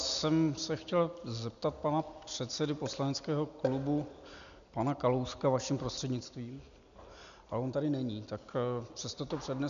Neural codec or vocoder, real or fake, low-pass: none; real; 7.2 kHz